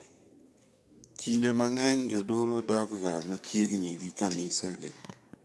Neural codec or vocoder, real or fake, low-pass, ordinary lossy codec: codec, 24 kHz, 1 kbps, SNAC; fake; none; none